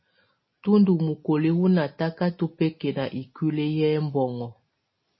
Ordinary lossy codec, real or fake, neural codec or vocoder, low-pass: MP3, 24 kbps; real; none; 7.2 kHz